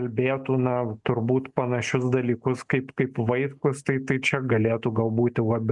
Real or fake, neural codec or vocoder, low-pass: real; none; 10.8 kHz